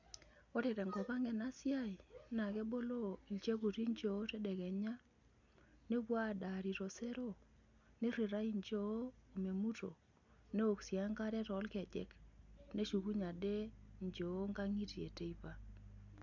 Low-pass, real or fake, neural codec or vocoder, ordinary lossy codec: 7.2 kHz; real; none; none